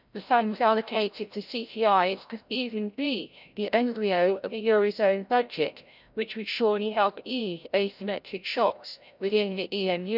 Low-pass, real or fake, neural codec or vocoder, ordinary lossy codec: 5.4 kHz; fake; codec, 16 kHz, 0.5 kbps, FreqCodec, larger model; none